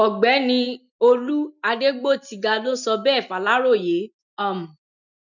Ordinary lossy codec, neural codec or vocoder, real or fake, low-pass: none; none; real; 7.2 kHz